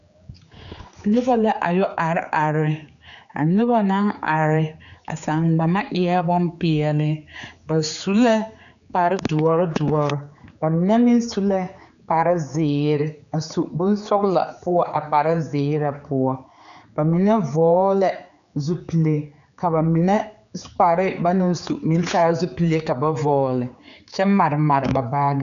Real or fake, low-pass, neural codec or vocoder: fake; 7.2 kHz; codec, 16 kHz, 4 kbps, X-Codec, HuBERT features, trained on general audio